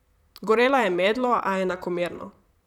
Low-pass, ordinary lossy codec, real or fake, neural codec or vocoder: 19.8 kHz; none; fake; vocoder, 44.1 kHz, 128 mel bands, Pupu-Vocoder